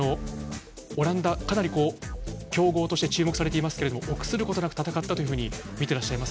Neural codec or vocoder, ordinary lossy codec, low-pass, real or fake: none; none; none; real